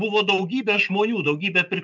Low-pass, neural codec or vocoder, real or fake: 7.2 kHz; none; real